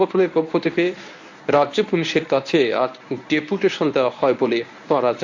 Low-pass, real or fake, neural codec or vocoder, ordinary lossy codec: 7.2 kHz; fake; codec, 24 kHz, 0.9 kbps, WavTokenizer, medium speech release version 1; AAC, 48 kbps